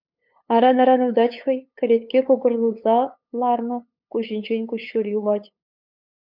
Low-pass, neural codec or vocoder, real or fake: 5.4 kHz; codec, 16 kHz, 8 kbps, FunCodec, trained on LibriTTS, 25 frames a second; fake